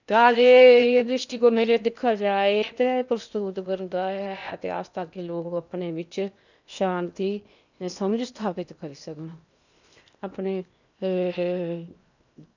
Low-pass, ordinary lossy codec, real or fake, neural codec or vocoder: 7.2 kHz; none; fake; codec, 16 kHz in and 24 kHz out, 0.8 kbps, FocalCodec, streaming, 65536 codes